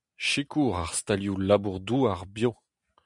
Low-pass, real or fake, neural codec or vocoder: 10.8 kHz; real; none